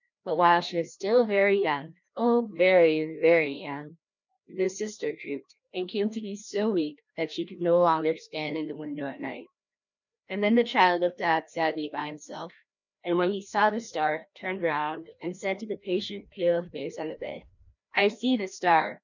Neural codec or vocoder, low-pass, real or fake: codec, 16 kHz, 1 kbps, FreqCodec, larger model; 7.2 kHz; fake